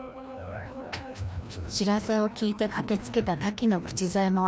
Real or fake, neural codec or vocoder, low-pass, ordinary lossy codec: fake; codec, 16 kHz, 1 kbps, FreqCodec, larger model; none; none